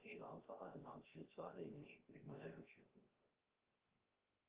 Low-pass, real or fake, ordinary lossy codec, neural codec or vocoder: 3.6 kHz; fake; Opus, 16 kbps; codec, 16 kHz, 0.3 kbps, FocalCodec